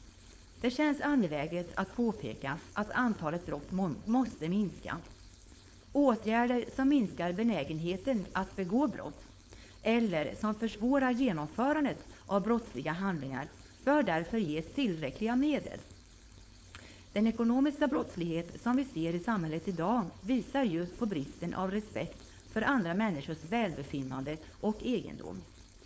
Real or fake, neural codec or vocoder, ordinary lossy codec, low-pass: fake; codec, 16 kHz, 4.8 kbps, FACodec; none; none